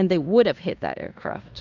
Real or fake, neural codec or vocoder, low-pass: fake; codec, 16 kHz in and 24 kHz out, 0.9 kbps, LongCat-Audio-Codec, fine tuned four codebook decoder; 7.2 kHz